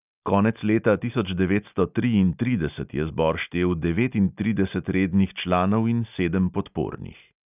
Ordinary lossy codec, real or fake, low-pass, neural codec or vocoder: none; real; 3.6 kHz; none